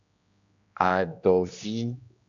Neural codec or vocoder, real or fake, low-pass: codec, 16 kHz, 1 kbps, X-Codec, HuBERT features, trained on general audio; fake; 7.2 kHz